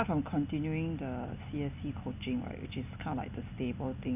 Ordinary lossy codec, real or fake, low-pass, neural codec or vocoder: none; real; 3.6 kHz; none